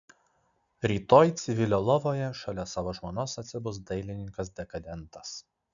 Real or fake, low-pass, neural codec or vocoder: real; 7.2 kHz; none